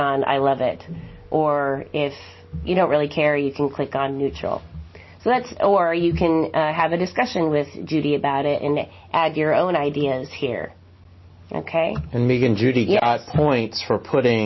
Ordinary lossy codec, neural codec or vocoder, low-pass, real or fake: MP3, 24 kbps; none; 7.2 kHz; real